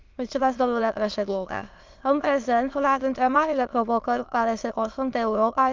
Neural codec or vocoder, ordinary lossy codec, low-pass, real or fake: autoencoder, 22.05 kHz, a latent of 192 numbers a frame, VITS, trained on many speakers; Opus, 24 kbps; 7.2 kHz; fake